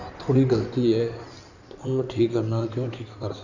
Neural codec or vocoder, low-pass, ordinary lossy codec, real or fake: codec, 16 kHz in and 24 kHz out, 2.2 kbps, FireRedTTS-2 codec; 7.2 kHz; none; fake